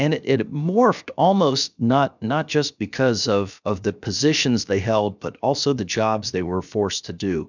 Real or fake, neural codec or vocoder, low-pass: fake; codec, 16 kHz, 0.7 kbps, FocalCodec; 7.2 kHz